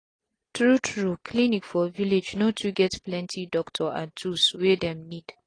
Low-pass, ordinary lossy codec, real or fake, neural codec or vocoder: 9.9 kHz; AAC, 32 kbps; real; none